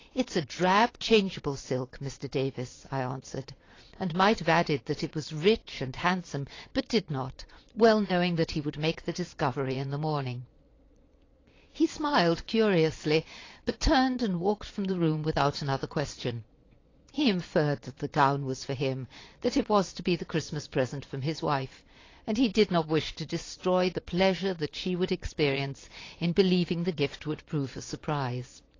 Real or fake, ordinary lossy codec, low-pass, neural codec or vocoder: fake; AAC, 32 kbps; 7.2 kHz; vocoder, 22.05 kHz, 80 mel bands, Vocos